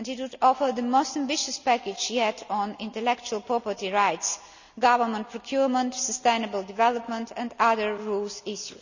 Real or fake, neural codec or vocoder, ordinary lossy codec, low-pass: real; none; none; 7.2 kHz